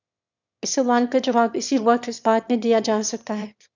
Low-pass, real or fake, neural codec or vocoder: 7.2 kHz; fake; autoencoder, 22.05 kHz, a latent of 192 numbers a frame, VITS, trained on one speaker